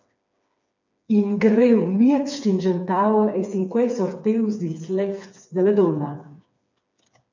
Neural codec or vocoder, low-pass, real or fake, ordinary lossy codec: codec, 16 kHz, 4 kbps, FreqCodec, smaller model; 7.2 kHz; fake; MP3, 64 kbps